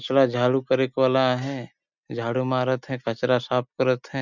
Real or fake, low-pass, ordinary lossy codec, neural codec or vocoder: real; 7.2 kHz; none; none